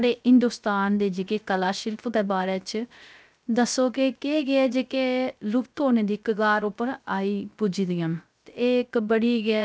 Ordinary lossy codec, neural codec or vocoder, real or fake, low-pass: none; codec, 16 kHz, 0.3 kbps, FocalCodec; fake; none